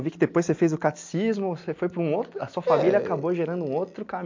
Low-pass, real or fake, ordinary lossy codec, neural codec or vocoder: 7.2 kHz; real; MP3, 48 kbps; none